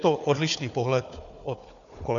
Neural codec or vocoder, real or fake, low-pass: codec, 16 kHz, 4 kbps, FunCodec, trained on Chinese and English, 50 frames a second; fake; 7.2 kHz